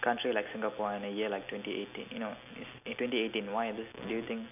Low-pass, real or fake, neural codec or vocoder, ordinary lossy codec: 3.6 kHz; real; none; none